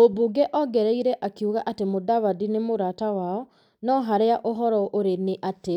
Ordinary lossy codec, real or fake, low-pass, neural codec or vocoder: none; real; 19.8 kHz; none